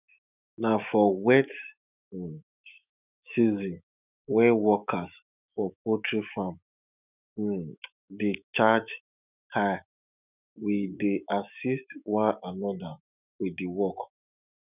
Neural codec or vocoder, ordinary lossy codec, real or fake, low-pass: autoencoder, 48 kHz, 128 numbers a frame, DAC-VAE, trained on Japanese speech; none; fake; 3.6 kHz